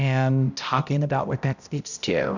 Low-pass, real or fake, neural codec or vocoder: 7.2 kHz; fake; codec, 16 kHz, 0.5 kbps, X-Codec, HuBERT features, trained on balanced general audio